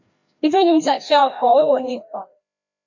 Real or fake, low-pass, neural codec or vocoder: fake; 7.2 kHz; codec, 16 kHz, 1 kbps, FreqCodec, larger model